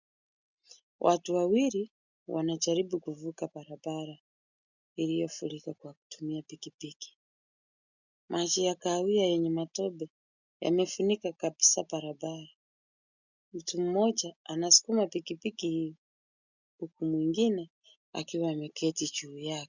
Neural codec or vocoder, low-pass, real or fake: none; 7.2 kHz; real